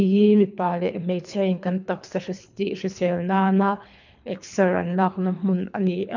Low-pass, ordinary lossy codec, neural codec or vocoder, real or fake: 7.2 kHz; none; codec, 24 kHz, 3 kbps, HILCodec; fake